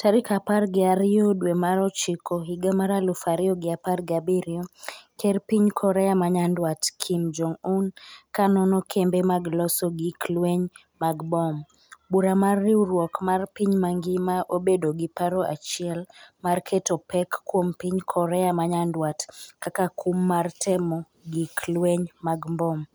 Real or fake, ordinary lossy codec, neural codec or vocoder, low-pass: real; none; none; none